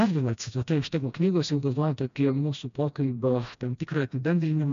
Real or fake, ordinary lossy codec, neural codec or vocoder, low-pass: fake; MP3, 64 kbps; codec, 16 kHz, 1 kbps, FreqCodec, smaller model; 7.2 kHz